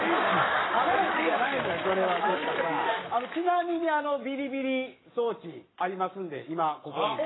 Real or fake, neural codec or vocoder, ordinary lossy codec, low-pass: fake; codec, 44.1 kHz, 7.8 kbps, Pupu-Codec; AAC, 16 kbps; 7.2 kHz